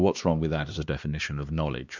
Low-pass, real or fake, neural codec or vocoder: 7.2 kHz; fake; codec, 16 kHz, 2 kbps, X-Codec, HuBERT features, trained on LibriSpeech